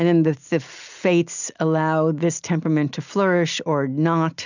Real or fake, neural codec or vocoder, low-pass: real; none; 7.2 kHz